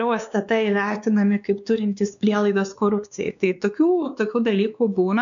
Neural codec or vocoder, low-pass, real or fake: codec, 16 kHz, 2 kbps, X-Codec, WavLM features, trained on Multilingual LibriSpeech; 7.2 kHz; fake